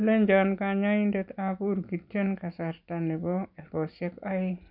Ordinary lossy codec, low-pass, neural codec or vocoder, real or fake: none; 5.4 kHz; none; real